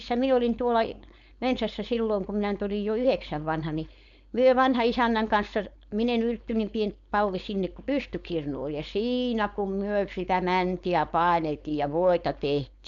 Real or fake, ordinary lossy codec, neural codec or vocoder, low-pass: fake; none; codec, 16 kHz, 4.8 kbps, FACodec; 7.2 kHz